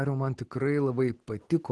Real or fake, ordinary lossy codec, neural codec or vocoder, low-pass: real; Opus, 16 kbps; none; 10.8 kHz